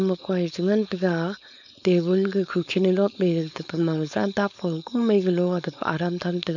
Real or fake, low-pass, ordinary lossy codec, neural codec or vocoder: fake; 7.2 kHz; none; codec, 16 kHz, 4.8 kbps, FACodec